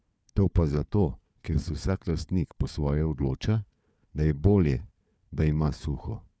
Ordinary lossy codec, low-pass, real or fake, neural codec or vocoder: none; none; fake; codec, 16 kHz, 4 kbps, FunCodec, trained on Chinese and English, 50 frames a second